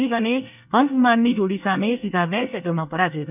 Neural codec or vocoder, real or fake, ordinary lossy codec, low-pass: codec, 24 kHz, 1 kbps, SNAC; fake; none; 3.6 kHz